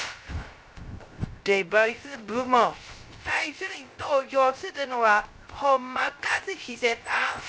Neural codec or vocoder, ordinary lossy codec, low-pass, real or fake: codec, 16 kHz, 0.3 kbps, FocalCodec; none; none; fake